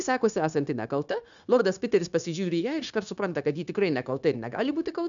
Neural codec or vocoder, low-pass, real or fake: codec, 16 kHz, 0.9 kbps, LongCat-Audio-Codec; 7.2 kHz; fake